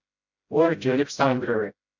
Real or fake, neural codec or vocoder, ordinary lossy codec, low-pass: fake; codec, 16 kHz, 0.5 kbps, FreqCodec, smaller model; MP3, 64 kbps; 7.2 kHz